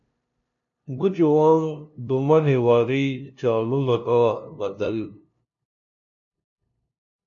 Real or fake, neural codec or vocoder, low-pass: fake; codec, 16 kHz, 0.5 kbps, FunCodec, trained on LibriTTS, 25 frames a second; 7.2 kHz